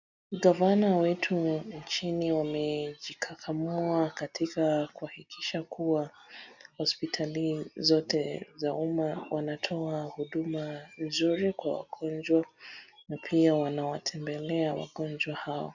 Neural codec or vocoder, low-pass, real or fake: none; 7.2 kHz; real